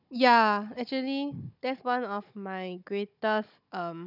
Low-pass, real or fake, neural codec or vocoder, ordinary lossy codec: 5.4 kHz; fake; codec, 16 kHz, 16 kbps, FunCodec, trained on Chinese and English, 50 frames a second; none